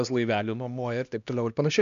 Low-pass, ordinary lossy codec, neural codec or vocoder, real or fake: 7.2 kHz; MP3, 64 kbps; codec, 16 kHz, 1 kbps, X-Codec, HuBERT features, trained on LibriSpeech; fake